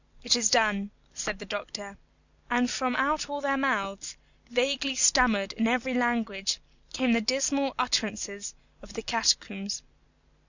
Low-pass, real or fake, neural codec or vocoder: 7.2 kHz; real; none